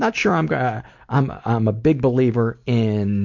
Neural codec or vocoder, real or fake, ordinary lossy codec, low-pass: none; real; MP3, 48 kbps; 7.2 kHz